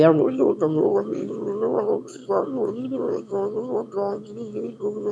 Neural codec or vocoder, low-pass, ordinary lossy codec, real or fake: autoencoder, 22.05 kHz, a latent of 192 numbers a frame, VITS, trained on one speaker; none; none; fake